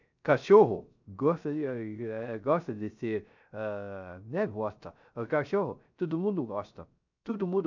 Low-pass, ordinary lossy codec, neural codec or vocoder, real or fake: 7.2 kHz; none; codec, 16 kHz, 0.3 kbps, FocalCodec; fake